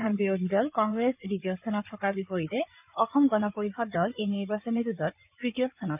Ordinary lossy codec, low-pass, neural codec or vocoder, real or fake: none; 3.6 kHz; codec, 16 kHz in and 24 kHz out, 2.2 kbps, FireRedTTS-2 codec; fake